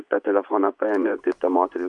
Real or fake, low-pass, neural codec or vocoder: fake; 10.8 kHz; vocoder, 24 kHz, 100 mel bands, Vocos